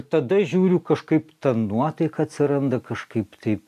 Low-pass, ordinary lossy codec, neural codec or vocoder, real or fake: 14.4 kHz; AAC, 96 kbps; none; real